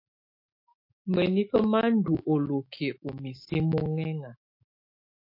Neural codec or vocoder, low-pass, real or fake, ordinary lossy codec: autoencoder, 48 kHz, 128 numbers a frame, DAC-VAE, trained on Japanese speech; 5.4 kHz; fake; MP3, 32 kbps